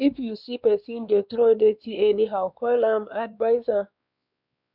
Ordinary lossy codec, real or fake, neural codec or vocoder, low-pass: none; fake; codec, 16 kHz, 2 kbps, X-Codec, WavLM features, trained on Multilingual LibriSpeech; 5.4 kHz